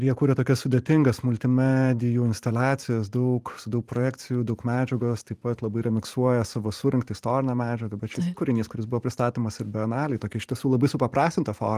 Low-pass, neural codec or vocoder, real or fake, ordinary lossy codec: 14.4 kHz; vocoder, 44.1 kHz, 128 mel bands every 512 samples, BigVGAN v2; fake; Opus, 24 kbps